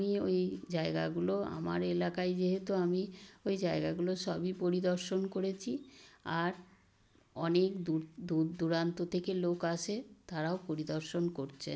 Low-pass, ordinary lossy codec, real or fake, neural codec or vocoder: none; none; real; none